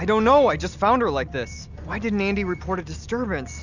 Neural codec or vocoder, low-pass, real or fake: none; 7.2 kHz; real